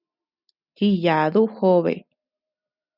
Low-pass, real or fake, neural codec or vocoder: 5.4 kHz; real; none